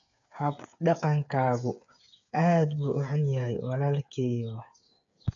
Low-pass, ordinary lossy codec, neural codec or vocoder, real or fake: 7.2 kHz; none; codec, 16 kHz, 8 kbps, FreqCodec, smaller model; fake